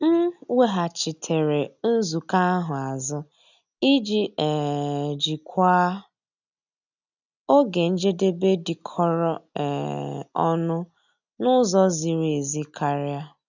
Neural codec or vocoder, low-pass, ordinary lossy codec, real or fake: none; 7.2 kHz; none; real